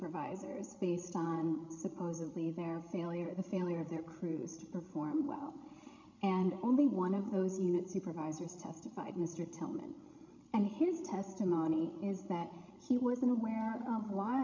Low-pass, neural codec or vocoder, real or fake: 7.2 kHz; codec, 16 kHz, 16 kbps, FreqCodec, larger model; fake